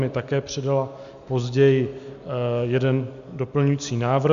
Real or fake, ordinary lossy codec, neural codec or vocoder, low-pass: real; MP3, 64 kbps; none; 7.2 kHz